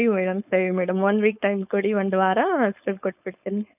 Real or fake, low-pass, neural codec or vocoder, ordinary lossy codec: fake; 3.6 kHz; codec, 16 kHz, 4.8 kbps, FACodec; none